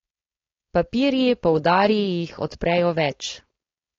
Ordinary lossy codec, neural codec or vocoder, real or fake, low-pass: AAC, 32 kbps; codec, 16 kHz, 4.8 kbps, FACodec; fake; 7.2 kHz